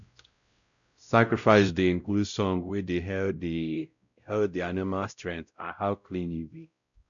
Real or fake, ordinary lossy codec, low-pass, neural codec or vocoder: fake; none; 7.2 kHz; codec, 16 kHz, 0.5 kbps, X-Codec, WavLM features, trained on Multilingual LibriSpeech